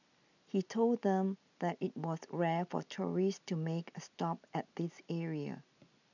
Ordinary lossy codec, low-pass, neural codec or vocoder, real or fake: none; 7.2 kHz; none; real